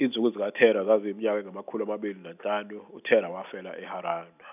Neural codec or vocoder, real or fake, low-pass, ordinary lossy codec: none; real; 3.6 kHz; none